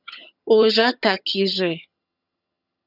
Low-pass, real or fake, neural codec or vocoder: 5.4 kHz; fake; vocoder, 22.05 kHz, 80 mel bands, HiFi-GAN